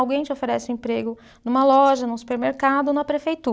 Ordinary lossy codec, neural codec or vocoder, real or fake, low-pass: none; none; real; none